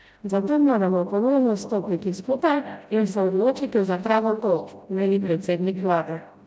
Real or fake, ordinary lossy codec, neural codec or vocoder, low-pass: fake; none; codec, 16 kHz, 0.5 kbps, FreqCodec, smaller model; none